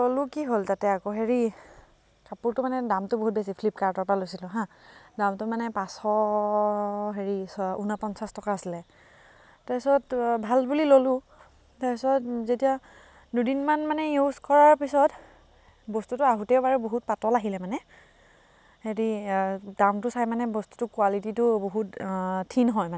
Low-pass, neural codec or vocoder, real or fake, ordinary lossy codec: none; none; real; none